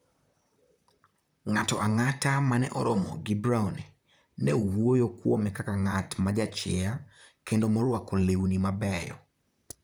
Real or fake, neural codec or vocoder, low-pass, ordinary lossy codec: fake; vocoder, 44.1 kHz, 128 mel bands, Pupu-Vocoder; none; none